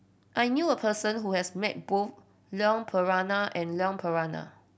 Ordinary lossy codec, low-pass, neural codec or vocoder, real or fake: none; none; none; real